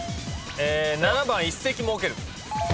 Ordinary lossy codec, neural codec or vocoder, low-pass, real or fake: none; none; none; real